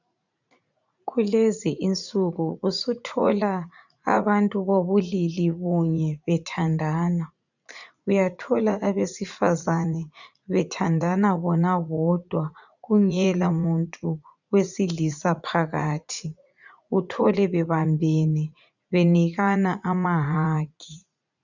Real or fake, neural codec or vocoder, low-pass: fake; vocoder, 44.1 kHz, 80 mel bands, Vocos; 7.2 kHz